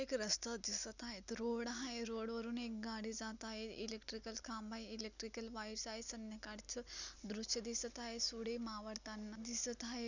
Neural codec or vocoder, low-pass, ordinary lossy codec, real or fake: none; 7.2 kHz; none; real